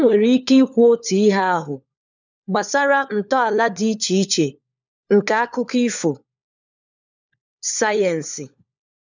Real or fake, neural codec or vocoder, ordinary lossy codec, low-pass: fake; codec, 16 kHz, 4 kbps, FunCodec, trained on LibriTTS, 50 frames a second; none; 7.2 kHz